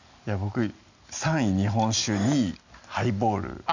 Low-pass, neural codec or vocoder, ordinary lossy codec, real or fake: 7.2 kHz; none; none; real